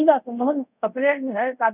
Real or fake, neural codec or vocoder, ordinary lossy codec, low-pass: fake; codec, 24 kHz, 0.5 kbps, DualCodec; none; 3.6 kHz